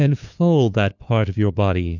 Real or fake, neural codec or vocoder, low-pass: fake; codec, 16 kHz, 2 kbps, FunCodec, trained on LibriTTS, 25 frames a second; 7.2 kHz